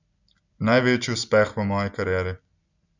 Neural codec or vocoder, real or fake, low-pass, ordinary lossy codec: none; real; 7.2 kHz; none